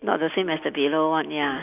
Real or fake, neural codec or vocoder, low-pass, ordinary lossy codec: real; none; 3.6 kHz; none